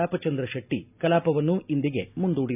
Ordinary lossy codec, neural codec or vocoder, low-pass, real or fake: none; none; 3.6 kHz; real